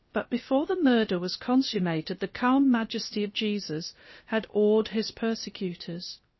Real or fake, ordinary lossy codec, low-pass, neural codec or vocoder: fake; MP3, 24 kbps; 7.2 kHz; codec, 16 kHz, about 1 kbps, DyCAST, with the encoder's durations